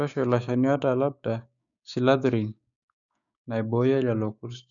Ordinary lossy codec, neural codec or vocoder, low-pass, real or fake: none; codec, 16 kHz, 6 kbps, DAC; 7.2 kHz; fake